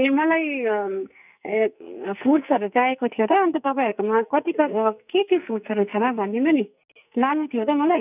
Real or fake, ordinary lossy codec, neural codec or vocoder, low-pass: fake; none; codec, 44.1 kHz, 2.6 kbps, SNAC; 3.6 kHz